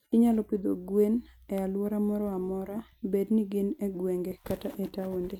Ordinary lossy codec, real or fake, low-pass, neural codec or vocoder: none; real; 19.8 kHz; none